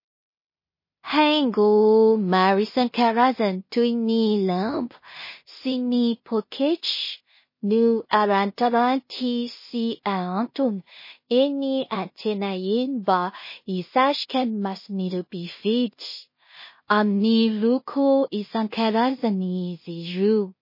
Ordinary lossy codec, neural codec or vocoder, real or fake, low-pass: MP3, 24 kbps; codec, 16 kHz in and 24 kHz out, 0.4 kbps, LongCat-Audio-Codec, two codebook decoder; fake; 5.4 kHz